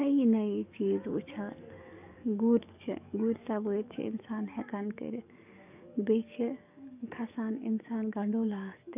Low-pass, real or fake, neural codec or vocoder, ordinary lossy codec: 3.6 kHz; fake; codec, 44.1 kHz, 7.8 kbps, DAC; none